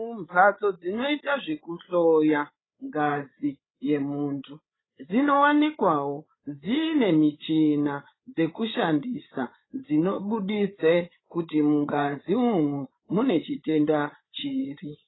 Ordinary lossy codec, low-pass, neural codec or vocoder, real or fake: AAC, 16 kbps; 7.2 kHz; codec, 16 kHz, 8 kbps, FreqCodec, larger model; fake